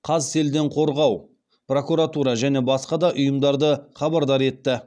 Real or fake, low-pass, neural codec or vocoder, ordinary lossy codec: real; 9.9 kHz; none; none